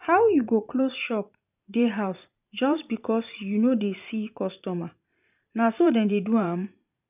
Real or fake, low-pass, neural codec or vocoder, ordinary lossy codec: fake; 3.6 kHz; vocoder, 44.1 kHz, 128 mel bands every 512 samples, BigVGAN v2; none